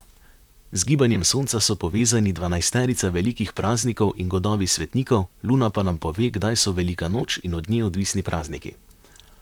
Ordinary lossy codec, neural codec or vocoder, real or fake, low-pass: none; vocoder, 44.1 kHz, 128 mel bands, Pupu-Vocoder; fake; 19.8 kHz